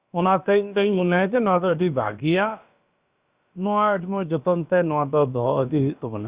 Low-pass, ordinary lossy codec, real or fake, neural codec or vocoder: 3.6 kHz; Opus, 64 kbps; fake; codec, 16 kHz, about 1 kbps, DyCAST, with the encoder's durations